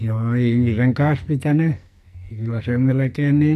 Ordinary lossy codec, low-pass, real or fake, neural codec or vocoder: none; 14.4 kHz; fake; codec, 44.1 kHz, 2.6 kbps, SNAC